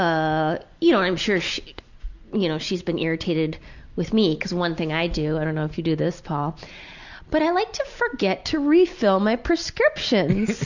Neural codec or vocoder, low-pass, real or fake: none; 7.2 kHz; real